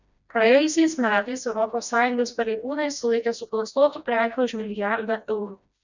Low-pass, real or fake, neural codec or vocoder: 7.2 kHz; fake; codec, 16 kHz, 1 kbps, FreqCodec, smaller model